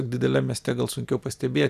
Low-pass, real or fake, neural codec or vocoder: 14.4 kHz; real; none